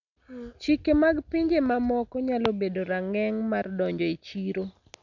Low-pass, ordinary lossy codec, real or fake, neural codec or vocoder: 7.2 kHz; none; real; none